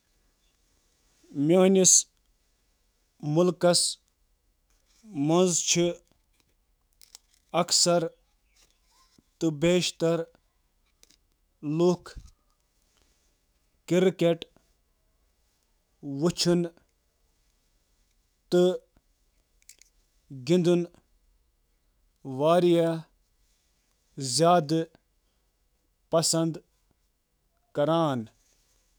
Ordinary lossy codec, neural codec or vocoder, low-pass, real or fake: none; autoencoder, 48 kHz, 128 numbers a frame, DAC-VAE, trained on Japanese speech; none; fake